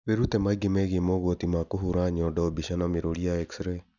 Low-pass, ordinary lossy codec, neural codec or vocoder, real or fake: 7.2 kHz; none; none; real